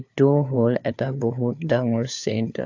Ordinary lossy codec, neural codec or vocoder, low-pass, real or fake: AAC, 48 kbps; codec, 16 kHz, 4 kbps, FunCodec, trained on LibriTTS, 50 frames a second; 7.2 kHz; fake